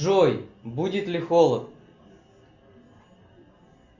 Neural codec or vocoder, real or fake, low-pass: none; real; 7.2 kHz